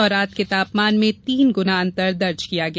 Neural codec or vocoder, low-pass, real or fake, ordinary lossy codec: none; none; real; none